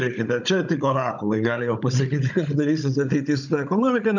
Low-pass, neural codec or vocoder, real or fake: 7.2 kHz; codec, 16 kHz, 16 kbps, FunCodec, trained on Chinese and English, 50 frames a second; fake